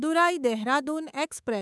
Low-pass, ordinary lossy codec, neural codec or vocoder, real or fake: none; none; codec, 24 kHz, 3.1 kbps, DualCodec; fake